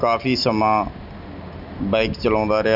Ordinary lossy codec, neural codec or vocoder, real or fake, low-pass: none; none; real; 5.4 kHz